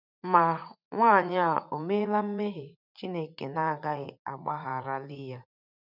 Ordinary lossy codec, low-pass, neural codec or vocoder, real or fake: none; 5.4 kHz; vocoder, 44.1 kHz, 80 mel bands, Vocos; fake